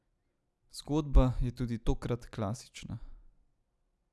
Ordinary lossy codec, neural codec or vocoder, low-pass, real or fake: none; none; none; real